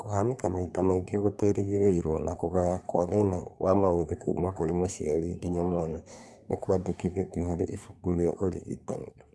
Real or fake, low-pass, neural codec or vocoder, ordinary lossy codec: fake; none; codec, 24 kHz, 1 kbps, SNAC; none